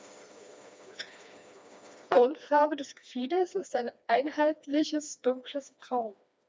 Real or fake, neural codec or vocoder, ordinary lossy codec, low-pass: fake; codec, 16 kHz, 2 kbps, FreqCodec, smaller model; none; none